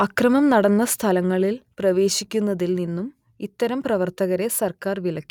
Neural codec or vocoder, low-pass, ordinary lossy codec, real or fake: none; 19.8 kHz; none; real